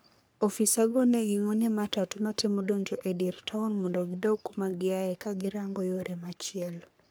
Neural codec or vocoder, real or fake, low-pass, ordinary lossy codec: codec, 44.1 kHz, 3.4 kbps, Pupu-Codec; fake; none; none